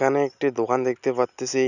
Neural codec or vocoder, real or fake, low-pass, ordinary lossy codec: none; real; 7.2 kHz; none